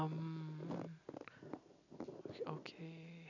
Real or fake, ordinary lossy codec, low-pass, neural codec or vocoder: real; none; 7.2 kHz; none